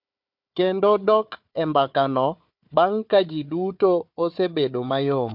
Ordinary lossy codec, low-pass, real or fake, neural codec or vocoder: MP3, 48 kbps; 5.4 kHz; fake; codec, 16 kHz, 4 kbps, FunCodec, trained on Chinese and English, 50 frames a second